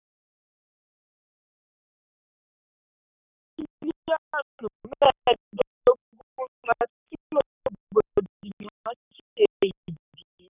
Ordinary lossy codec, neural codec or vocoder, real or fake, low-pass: none; codec, 44.1 kHz, 7.8 kbps, Pupu-Codec; fake; 3.6 kHz